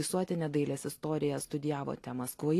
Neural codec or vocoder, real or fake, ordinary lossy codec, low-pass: none; real; AAC, 48 kbps; 14.4 kHz